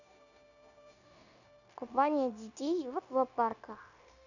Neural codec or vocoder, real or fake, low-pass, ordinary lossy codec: codec, 16 kHz, 0.9 kbps, LongCat-Audio-Codec; fake; 7.2 kHz; none